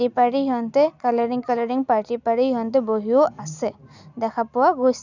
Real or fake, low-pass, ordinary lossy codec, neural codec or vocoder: real; 7.2 kHz; none; none